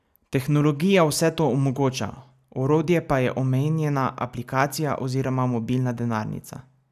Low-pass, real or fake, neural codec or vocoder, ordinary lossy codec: 14.4 kHz; real; none; none